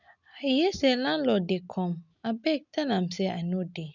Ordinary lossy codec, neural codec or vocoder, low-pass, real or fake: none; none; 7.2 kHz; real